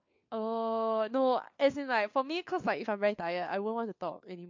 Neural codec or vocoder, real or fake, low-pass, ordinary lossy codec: codec, 16 kHz, 4 kbps, FunCodec, trained on LibriTTS, 50 frames a second; fake; 7.2 kHz; MP3, 48 kbps